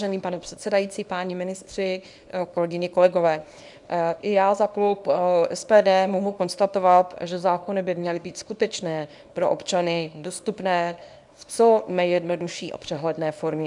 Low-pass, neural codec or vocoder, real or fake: 10.8 kHz; codec, 24 kHz, 0.9 kbps, WavTokenizer, small release; fake